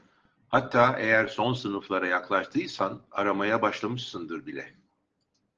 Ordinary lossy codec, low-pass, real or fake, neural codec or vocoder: Opus, 16 kbps; 7.2 kHz; real; none